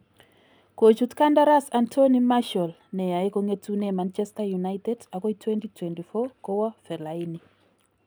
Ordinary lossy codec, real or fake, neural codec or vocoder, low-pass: none; real; none; none